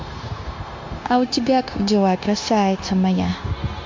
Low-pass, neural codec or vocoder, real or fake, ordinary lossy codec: 7.2 kHz; codec, 16 kHz, 0.9 kbps, LongCat-Audio-Codec; fake; MP3, 48 kbps